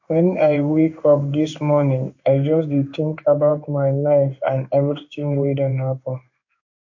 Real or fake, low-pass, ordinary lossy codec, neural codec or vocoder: fake; 7.2 kHz; MP3, 48 kbps; codec, 16 kHz in and 24 kHz out, 1 kbps, XY-Tokenizer